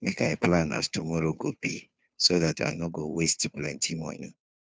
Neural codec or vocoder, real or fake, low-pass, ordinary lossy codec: codec, 16 kHz, 2 kbps, FunCodec, trained on Chinese and English, 25 frames a second; fake; none; none